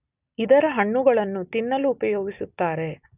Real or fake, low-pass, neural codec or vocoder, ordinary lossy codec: real; 3.6 kHz; none; none